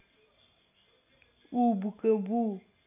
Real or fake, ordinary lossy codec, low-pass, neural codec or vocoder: real; none; 3.6 kHz; none